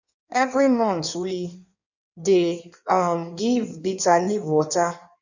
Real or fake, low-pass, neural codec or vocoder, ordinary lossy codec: fake; 7.2 kHz; codec, 16 kHz in and 24 kHz out, 1.1 kbps, FireRedTTS-2 codec; none